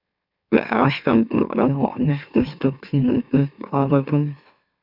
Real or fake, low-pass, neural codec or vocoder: fake; 5.4 kHz; autoencoder, 44.1 kHz, a latent of 192 numbers a frame, MeloTTS